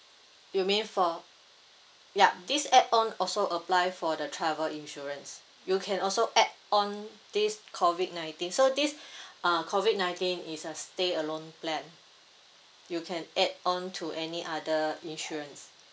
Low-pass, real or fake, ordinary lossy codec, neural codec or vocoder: none; real; none; none